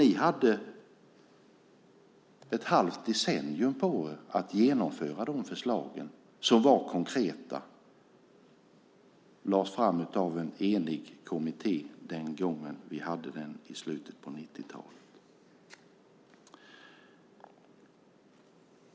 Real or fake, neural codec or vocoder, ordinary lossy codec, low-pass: real; none; none; none